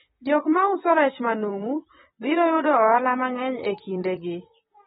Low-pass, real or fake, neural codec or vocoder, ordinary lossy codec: 19.8 kHz; fake; vocoder, 44.1 kHz, 128 mel bands, Pupu-Vocoder; AAC, 16 kbps